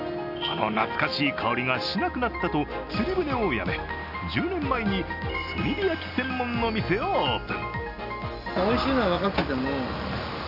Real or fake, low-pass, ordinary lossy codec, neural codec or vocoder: real; 5.4 kHz; none; none